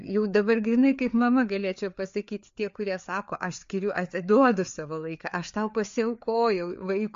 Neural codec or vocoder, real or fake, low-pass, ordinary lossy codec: codec, 16 kHz, 4 kbps, FreqCodec, larger model; fake; 7.2 kHz; MP3, 48 kbps